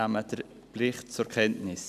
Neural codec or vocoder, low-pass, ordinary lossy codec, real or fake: none; 14.4 kHz; none; real